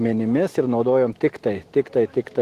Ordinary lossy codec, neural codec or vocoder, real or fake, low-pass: Opus, 16 kbps; autoencoder, 48 kHz, 128 numbers a frame, DAC-VAE, trained on Japanese speech; fake; 14.4 kHz